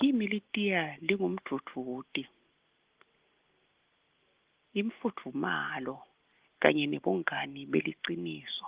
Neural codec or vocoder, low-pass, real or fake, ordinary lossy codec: none; 3.6 kHz; real; Opus, 16 kbps